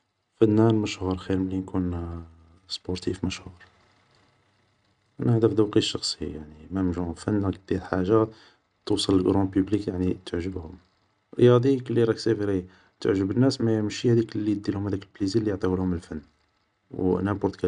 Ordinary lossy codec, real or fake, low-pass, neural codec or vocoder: none; real; 9.9 kHz; none